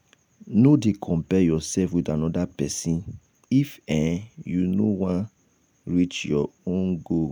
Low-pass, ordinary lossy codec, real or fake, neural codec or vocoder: none; none; real; none